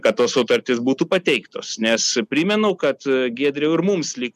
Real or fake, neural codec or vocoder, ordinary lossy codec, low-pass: real; none; MP3, 96 kbps; 14.4 kHz